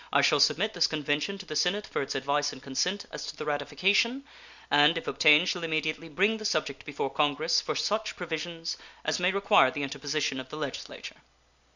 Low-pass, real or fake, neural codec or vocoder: 7.2 kHz; real; none